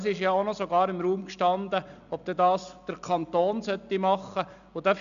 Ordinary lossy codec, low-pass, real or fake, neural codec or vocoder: none; 7.2 kHz; real; none